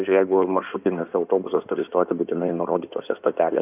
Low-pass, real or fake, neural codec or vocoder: 3.6 kHz; fake; codec, 16 kHz in and 24 kHz out, 2.2 kbps, FireRedTTS-2 codec